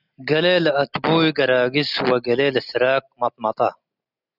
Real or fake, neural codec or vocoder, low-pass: real; none; 5.4 kHz